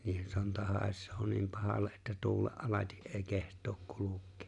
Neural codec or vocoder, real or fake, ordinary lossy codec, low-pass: none; real; none; 10.8 kHz